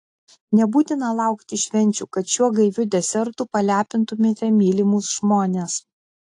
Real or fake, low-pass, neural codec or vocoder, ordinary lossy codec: real; 10.8 kHz; none; AAC, 48 kbps